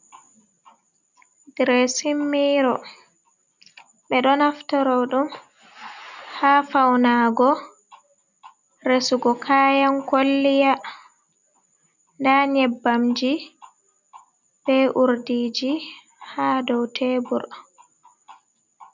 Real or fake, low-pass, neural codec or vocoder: real; 7.2 kHz; none